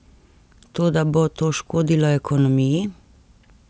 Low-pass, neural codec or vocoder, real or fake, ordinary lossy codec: none; none; real; none